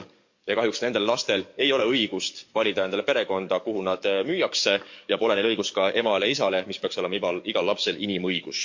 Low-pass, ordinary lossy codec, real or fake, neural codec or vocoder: 7.2 kHz; MP3, 48 kbps; fake; codec, 44.1 kHz, 7.8 kbps, DAC